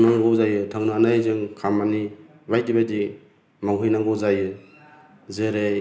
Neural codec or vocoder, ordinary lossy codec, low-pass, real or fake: none; none; none; real